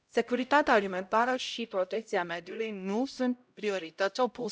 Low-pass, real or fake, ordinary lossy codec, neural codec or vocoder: none; fake; none; codec, 16 kHz, 0.5 kbps, X-Codec, HuBERT features, trained on LibriSpeech